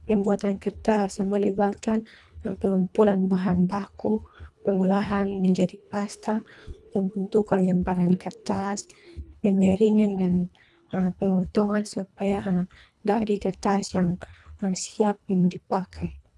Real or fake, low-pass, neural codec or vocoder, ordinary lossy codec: fake; none; codec, 24 kHz, 1.5 kbps, HILCodec; none